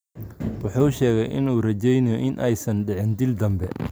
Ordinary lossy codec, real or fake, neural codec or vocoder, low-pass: none; real; none; none